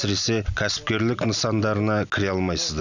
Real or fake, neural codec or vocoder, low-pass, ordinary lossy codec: real; none; 7.2 kHz; none